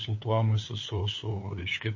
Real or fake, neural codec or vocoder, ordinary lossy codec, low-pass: fake; codec, 16 kHz, 8 kbps, FunCodec, trained on LibriTTS, 25 frames a second; MP3, 32 kbps; 7.2 kHz